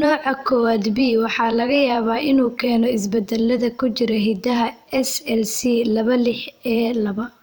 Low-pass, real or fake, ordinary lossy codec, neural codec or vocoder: none; fake; none; vocoder, 44.1 kHz, 128 mel bands every 512 samples, BigVGAN v2